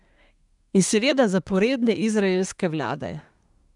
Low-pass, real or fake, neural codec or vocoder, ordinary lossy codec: 10.8 kHz; fake; codec, 24 kHz, 1 kbps, SNAC; none